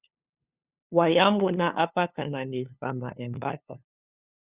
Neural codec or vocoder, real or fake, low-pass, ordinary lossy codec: codec, 16 kHz, 2 kbps, FunCodec, trained on LibriTTS, 25 frames a second; fake; 3.6 kHz; Opus, 64 kbps